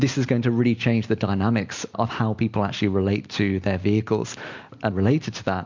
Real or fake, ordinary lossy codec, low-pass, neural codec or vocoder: real; MP3, 64 kbps; 7.2 kHz; none